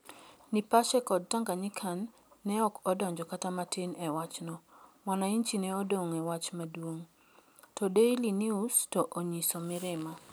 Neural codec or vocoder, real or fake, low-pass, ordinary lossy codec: none; real; none; none